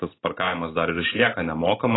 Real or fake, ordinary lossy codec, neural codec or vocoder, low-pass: real; AAC, 16 kbps; none; 7.2 kHz